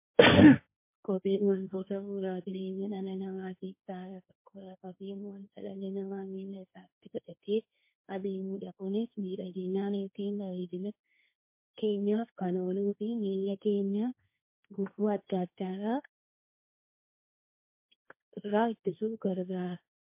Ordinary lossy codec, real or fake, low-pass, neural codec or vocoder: MP3, 24 kbps; fake; 3.6 kHz; codec, 16 kHz, 1.1 kbps, Voila-Tokenizer